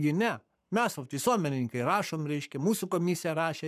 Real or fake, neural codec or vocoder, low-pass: fake; codec, 44.1 kHz, 7.8 kbps, Pupu-Codec; 14.4 kHz